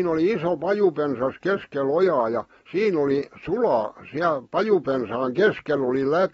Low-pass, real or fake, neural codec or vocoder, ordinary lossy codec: 19.8 kHz; real; none; AAC, 24 kbps